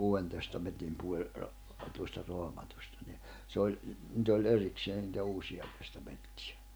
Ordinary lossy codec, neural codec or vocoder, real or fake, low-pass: none; none; real; none